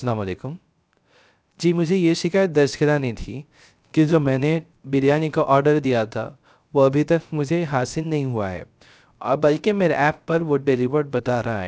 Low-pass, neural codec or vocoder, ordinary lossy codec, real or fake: none; codec, 16 kHz, 0.3 kbps, FocalCodec; none; fake